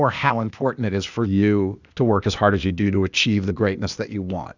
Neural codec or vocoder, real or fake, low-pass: codec, 16 kHz, 0.8 kbps, ZipCodec; fake; 7.2 kHz